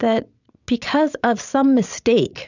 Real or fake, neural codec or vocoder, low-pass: fake; vocoder, 22.05 kHz, 80 mel bands, WaveNeXt; 7.2 kHz